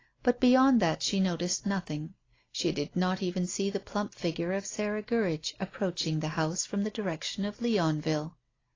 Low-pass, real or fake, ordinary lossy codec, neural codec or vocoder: 7.2 kHz; real; AAC, 32 kbps; none